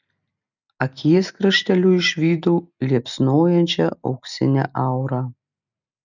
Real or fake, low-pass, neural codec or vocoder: real; 7.2 kHz; none